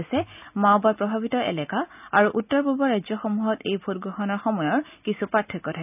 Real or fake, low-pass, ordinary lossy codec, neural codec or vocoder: real; 3.6 kHz; none; none